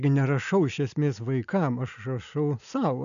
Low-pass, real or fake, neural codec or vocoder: 7.2 kHz; real; none